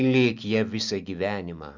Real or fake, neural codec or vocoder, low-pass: real; none; 7.2 kHz